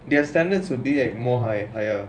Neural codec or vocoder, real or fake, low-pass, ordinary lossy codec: vocoder, 24 kHz, 100 mel bands, Vocos; fake; 9.9 kHz; none